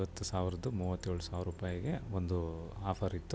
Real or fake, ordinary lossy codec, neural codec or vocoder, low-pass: real; none; none; none